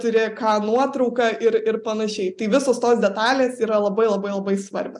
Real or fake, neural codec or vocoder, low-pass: real; none; 10.8 kHz